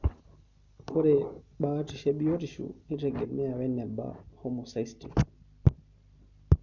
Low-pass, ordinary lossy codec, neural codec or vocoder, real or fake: 7.2 kHz; none; none; real